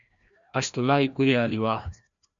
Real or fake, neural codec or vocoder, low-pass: fake; codec, 16 kHz, 1 kbps, FreqCodec, larger model; 7.2 kHz